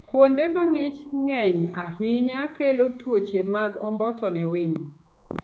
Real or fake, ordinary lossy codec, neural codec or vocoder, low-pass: fake; none; codec, 16 kHz, 2 kbps, X-Codec, HuBERT features, trained on general audio; none